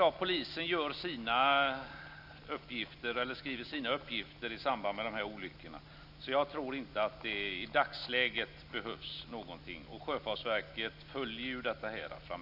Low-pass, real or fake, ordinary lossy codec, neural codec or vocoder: 5.4 kHz; real; none; none